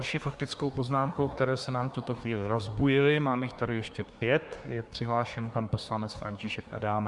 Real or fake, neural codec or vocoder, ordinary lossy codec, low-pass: fake; codec, 24 kHz, 1 kbps, SNAC; Opus, 64 kbps; 10.8 kHz